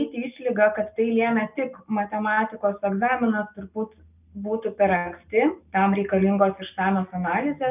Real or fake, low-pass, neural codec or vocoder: real; 3.6 kHz; none